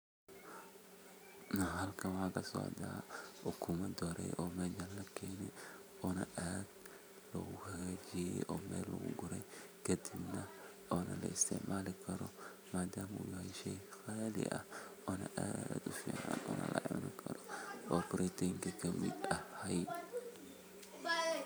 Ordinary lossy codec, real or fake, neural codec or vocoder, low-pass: none; fake; vocoder, 44.1 kHz, 128 mel bands every 512 samples, BigVGAN v2; none